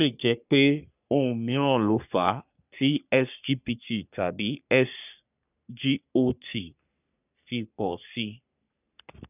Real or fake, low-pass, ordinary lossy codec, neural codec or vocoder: fake; 3.6 kHz; none; codec, 44.1 kHz, 3.4 kbps, Pupu-Codec